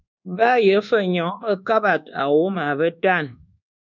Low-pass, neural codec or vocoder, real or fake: 7.2 kHz; codec, 24 kHz, 1.2 kbps, DualCodec; fake